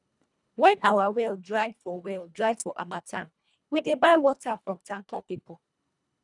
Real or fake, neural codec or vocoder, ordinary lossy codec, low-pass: fake; codec, 24 kHz, 1.5 kbps, HILCodec; none; 10.8 kHz